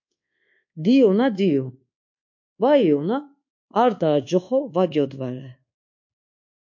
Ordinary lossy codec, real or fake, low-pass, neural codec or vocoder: MP3, 48 kbps; fake; 7.2 kHz; codec, 24 kHz, 1.2 kbps, DualCodec